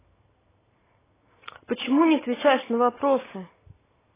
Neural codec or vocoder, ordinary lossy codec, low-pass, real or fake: none; AAC, 16 kbps; 3.6 kHz; real